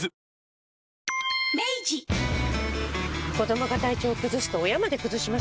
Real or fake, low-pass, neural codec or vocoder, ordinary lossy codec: real; none; none; none